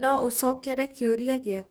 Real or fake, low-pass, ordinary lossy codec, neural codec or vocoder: fake; none; none; codec, 44.1 kHz, 2.6 kbps, DAC